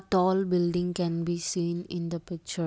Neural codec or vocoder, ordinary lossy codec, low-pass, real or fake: none; none; none; real